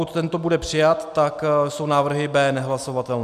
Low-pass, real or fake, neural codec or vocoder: 14.4 kHz; real; none